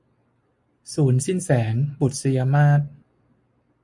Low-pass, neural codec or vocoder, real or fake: 10.8 kHz; none; real